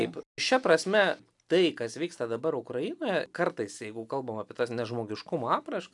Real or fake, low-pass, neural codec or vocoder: real; 10.8 kHz; none